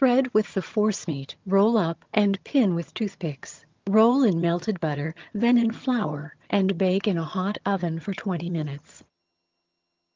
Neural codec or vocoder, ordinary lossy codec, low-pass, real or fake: vocoder, 22.05 kHz, 80 mel bands, HiFi-GAN; Opus, 24 kbps; 7.2 kHz; fake